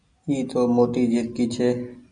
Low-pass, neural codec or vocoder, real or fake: 9.9 kHz; none; real